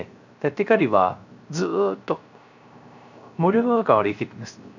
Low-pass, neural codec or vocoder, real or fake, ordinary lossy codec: 7.2 kHz; codec, 16 kHz, 0.3 kbps, FocalCodec; fake; none